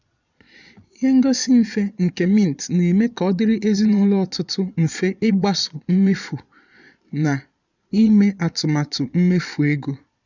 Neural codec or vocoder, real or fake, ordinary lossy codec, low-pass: vocoder, 22.05 kHz, 80 mel bands, Vocos; fake; none; 7.2 kHz